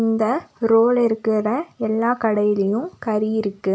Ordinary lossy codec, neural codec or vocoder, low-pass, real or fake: none; none; none; real